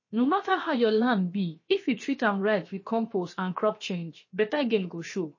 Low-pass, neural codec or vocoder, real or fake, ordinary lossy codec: 7.2 kHz; codec, 16 kHz, 0.7 kbps, FocalCodec; fake; MP3, 32 kbps